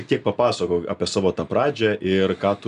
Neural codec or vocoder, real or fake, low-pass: none; real; 10.8 kHz